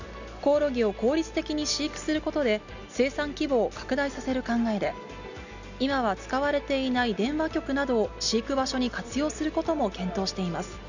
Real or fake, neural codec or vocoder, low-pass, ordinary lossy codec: real; none; 7.2 kHz; none